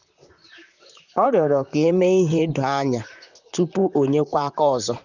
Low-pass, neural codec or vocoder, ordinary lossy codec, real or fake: 7.2 kHz; vocoder, 44.1 kHz, 128 mel bands, Pupu-Vocoder; none; fake